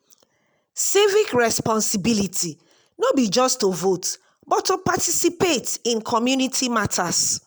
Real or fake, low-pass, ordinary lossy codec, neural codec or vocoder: real; none; none; none